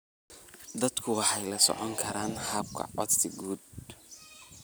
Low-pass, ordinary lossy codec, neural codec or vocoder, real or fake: none; none; none; real